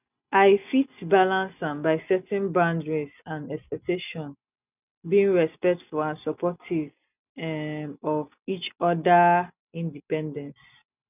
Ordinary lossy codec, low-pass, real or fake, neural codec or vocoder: none; 3.6 kHz; real; none